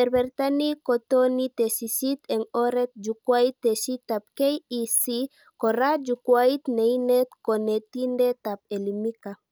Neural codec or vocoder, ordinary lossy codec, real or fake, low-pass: none; none; real; none